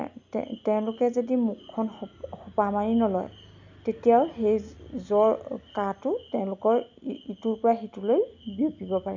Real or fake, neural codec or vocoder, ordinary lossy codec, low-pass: real; none; none; 7.2 kHz